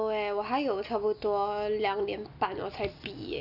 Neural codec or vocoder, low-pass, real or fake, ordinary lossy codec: none; 5.4 kHz; real; none